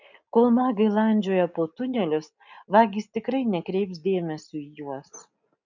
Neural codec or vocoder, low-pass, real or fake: none; 7.2 kHz; real